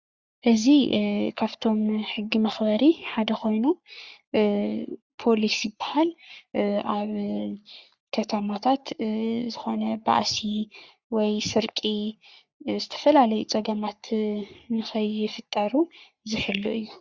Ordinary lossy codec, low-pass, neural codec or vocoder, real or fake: Opus, 64 kbps; 7.2 kHz; codec, 44.1 kHz, 3.4 kbps, Pupu-Codec; fake